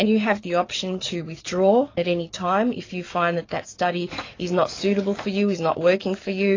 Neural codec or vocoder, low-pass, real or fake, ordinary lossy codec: codec, 44.1 kHz, 7.8 kbps, DAC; 7.2 kHz; fake; AAC, 32 kbps